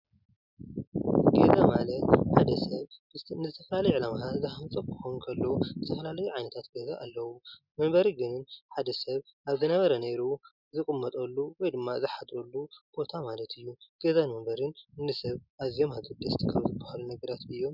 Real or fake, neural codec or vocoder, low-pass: real; none; 5.4 kHz